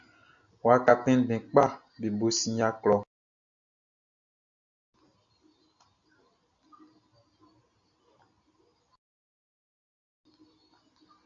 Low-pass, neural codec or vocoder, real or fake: 7.2 kHz; none; real